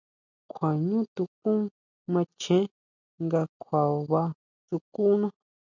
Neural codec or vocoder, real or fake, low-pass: none; real; 7.2 kHz